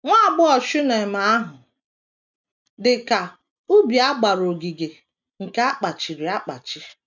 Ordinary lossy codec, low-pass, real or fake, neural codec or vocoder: none; 7.2 kHz; real; none